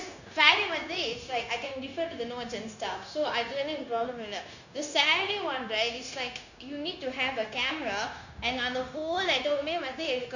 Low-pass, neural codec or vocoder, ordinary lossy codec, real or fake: 7.2 kHz; codec, 16 kHz, 0.9 kbps, LongCat-Audio-Codec; none; fake